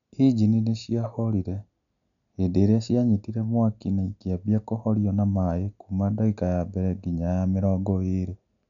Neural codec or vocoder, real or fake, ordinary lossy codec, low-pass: none; real; none; 7.2 kHz